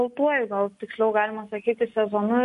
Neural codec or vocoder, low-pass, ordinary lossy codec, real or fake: none; 14.4 kHz; MP3, 48 kbps; real